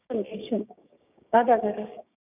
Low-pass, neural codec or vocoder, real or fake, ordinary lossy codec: 3.6 kHz; codec, 16 kHz, 6 kbps, DAC; fake; none